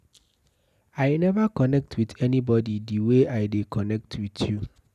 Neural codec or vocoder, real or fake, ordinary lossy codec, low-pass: none; real; none; 14.4 kHz